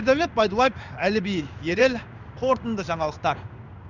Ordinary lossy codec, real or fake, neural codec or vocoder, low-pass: none; fake; codec, 16 kHz in and 24 kHz out, 1 kbps, XY-Tokenizer; 7.2 kHz